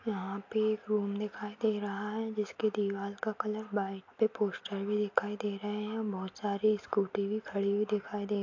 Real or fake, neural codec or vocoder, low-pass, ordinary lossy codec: real; none; 7.2 kHz; AAC, 48 kbps